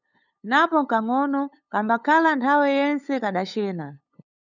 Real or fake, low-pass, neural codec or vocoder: fake; 7.2 kHz; codec, 16 kHz, 8 kbps, FunCodec, trained on LibriTTS, 25 frames a second